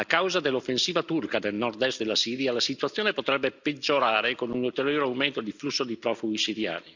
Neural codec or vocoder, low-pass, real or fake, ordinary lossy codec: none; 7.2 kHz; real; none